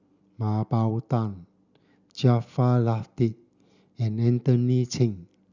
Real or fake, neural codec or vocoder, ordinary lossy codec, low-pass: real; none; none; 7.2 kHz